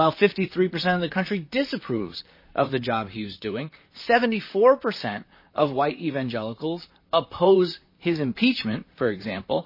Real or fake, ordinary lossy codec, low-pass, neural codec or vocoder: fake; MP3, 24 kbps; 5.4 kHz; vocoder, 44.1 kHz, 128 mel bands, Pupu-Vocoder